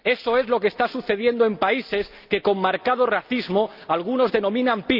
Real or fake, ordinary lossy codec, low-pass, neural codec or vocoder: real; Opus, 24 kbps; 5.4 kHz; none